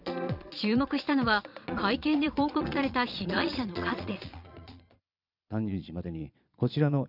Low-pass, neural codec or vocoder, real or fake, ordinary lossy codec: 5.4 kHz; vocoder, 22.05 kHz, 80 mel bands, Vocos; fake; none